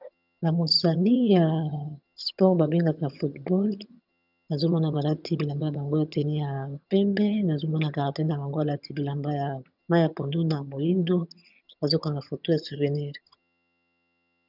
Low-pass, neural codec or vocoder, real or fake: 5.4 kHz; vocoder, 22.05 kHz, 80 mel bands, HiFi-GAN; fake